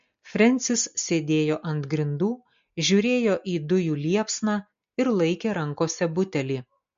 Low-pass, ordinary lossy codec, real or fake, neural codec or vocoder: 7.2 kHz; MP3, 48 kbps; real; none